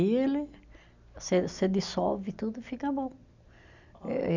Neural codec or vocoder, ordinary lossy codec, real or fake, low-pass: none; none; real; 7.2 kHz